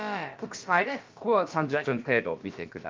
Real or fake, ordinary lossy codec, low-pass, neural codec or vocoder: fake; Opus, 24 kbps; 7.2 kHz; codec, 16 kHz, about 1 kbps, DyCAST, with the encoder's durations